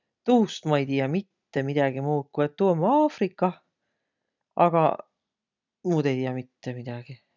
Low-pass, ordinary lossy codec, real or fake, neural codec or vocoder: 7.2 kHz; none; real; none